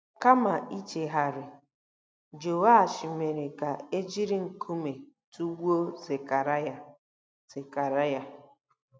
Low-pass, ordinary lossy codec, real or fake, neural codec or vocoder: none; none; real; none